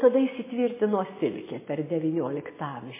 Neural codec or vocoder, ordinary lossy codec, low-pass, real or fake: none; MP3, 16 kbps; 3.6 kHz; real